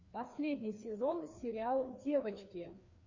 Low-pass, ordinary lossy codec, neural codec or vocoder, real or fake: 7.2 kHz; Opus, 64 kbps; codec, 16 kHz, 2 kbps, FreqCodec, larger model; fake